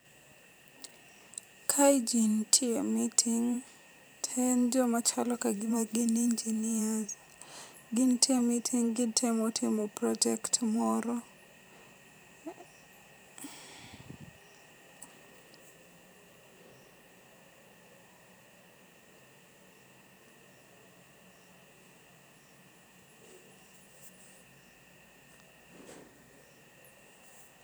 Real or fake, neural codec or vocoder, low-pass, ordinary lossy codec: fake; vocoder, 44.1 kHz, 128 mel bands every 512 samples, BigVGAN v2; none; none